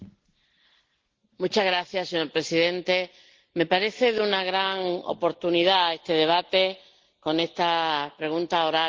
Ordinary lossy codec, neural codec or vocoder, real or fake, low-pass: Opus, 16 kbps; none; real; 7.2 kHz